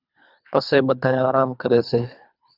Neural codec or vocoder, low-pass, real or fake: codec, 24 kHz, 3 kbps, HILCodec; 5.4 kHz; fake